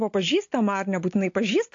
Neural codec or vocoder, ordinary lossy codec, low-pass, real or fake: none; AAC, 48 kbps; 7.2 kHz; real